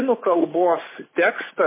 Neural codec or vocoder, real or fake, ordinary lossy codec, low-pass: none; real; MP3, 16 kbps; 3.6 kHz